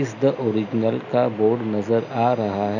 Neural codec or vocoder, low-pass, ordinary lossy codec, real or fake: none; 7.2 kHz; none; real